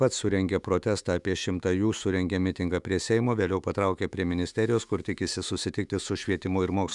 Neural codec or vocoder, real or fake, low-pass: autoencoder, 48 kHz, 128 numbers a frame, DAC-VAE, trained on Japanese speech; fake; 10.8 kHz